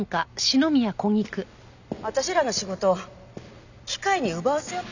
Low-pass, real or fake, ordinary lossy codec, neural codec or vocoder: 7.2 kHz; real; none; none